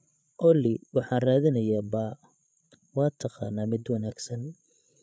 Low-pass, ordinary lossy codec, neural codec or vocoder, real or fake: none; none; codec, 16 kHz, 8 kbps, FreqCodec, larger model; fake